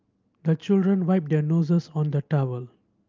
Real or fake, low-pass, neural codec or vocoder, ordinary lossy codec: real; 7.2 kHz; none; Opus, 24 kbps